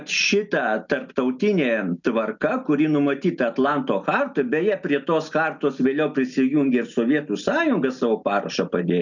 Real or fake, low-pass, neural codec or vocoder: real; 7.2 kHz; none